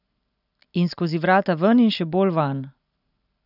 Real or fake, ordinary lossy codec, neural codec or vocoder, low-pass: real; none; none; 5.4 kHz